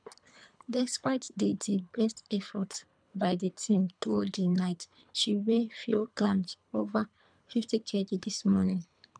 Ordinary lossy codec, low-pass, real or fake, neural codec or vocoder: none; 9.9 kHz; fake; codec, 24 kHz, 3 kbps, HILCodec